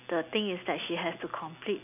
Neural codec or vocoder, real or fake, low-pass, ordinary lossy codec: none; real; 3.6 kHz; none